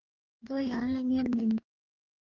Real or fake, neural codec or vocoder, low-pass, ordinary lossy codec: fake; codec, 44.1 kHz, 2.6 kbps, DAC; 7.2 kHz; Opus, 24 kbps